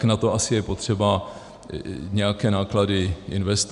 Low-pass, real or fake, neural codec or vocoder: 10.8 kHz; real; none